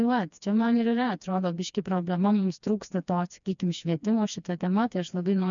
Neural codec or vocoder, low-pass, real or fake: codec, 16 kHz, 2 kbps, FreqCodec, smaller model; 7.2 kHz; fake